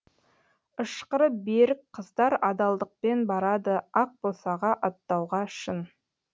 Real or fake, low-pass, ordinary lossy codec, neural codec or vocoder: real; none; none; none